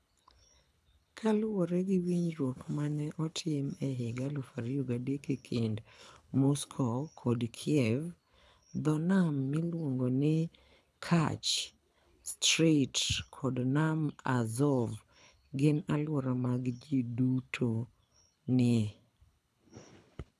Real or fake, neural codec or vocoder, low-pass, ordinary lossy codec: fake; codec, 24 kHz, 6 kbps, HILCodec; none; none